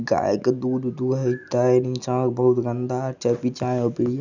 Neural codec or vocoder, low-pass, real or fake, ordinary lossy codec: none; 7.2 kHz; real; none